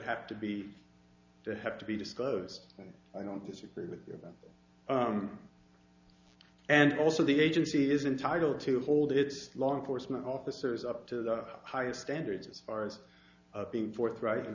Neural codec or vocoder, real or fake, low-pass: none; real; 7.2 kHz